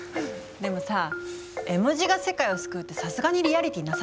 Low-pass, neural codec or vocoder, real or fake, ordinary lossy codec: none; none; real; none